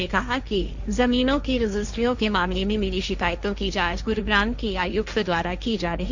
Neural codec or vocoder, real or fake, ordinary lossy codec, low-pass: codec, 16 kHz, 1.1 kbps, Voila-Tokenizer; fake; none; 7.2 kHz